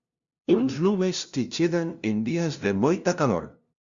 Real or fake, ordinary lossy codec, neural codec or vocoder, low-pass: fake; Opus, 64 kbps; codec, 16 kHz, 0.5 kbps, FunCodec, trained on LibriTTS, 25 frames a second; 7.2 kHz